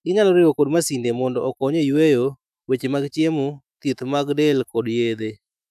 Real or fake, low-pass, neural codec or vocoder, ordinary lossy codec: fake; 14.4 kHz; autoencoder, 48 kHz, 128 numbers a frame, DAC-VAE, trained on Japanese speech; none